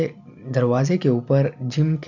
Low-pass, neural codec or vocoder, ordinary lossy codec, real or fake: 7.2 kHz; none; none; real